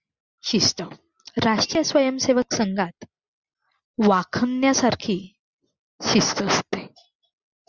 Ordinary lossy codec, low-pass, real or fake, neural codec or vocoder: Opus, 64 kbps; 7.2 kHz; real; none